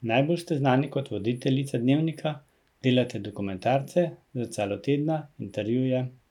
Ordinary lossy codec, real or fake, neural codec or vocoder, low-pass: none; real; none; 19.8 kHz